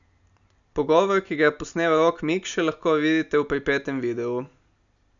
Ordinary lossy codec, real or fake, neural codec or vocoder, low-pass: none; real; none; 7.2 kHz